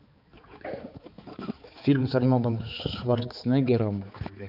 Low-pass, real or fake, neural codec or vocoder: 5.4 kHz; fake; codec, 16 kHz, 4 kbps, X-Codec, HuBERT features, trained on balanced general audio